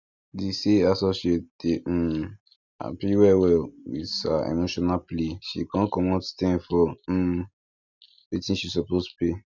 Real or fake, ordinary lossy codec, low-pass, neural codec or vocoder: real; none; 7.2 kHz; none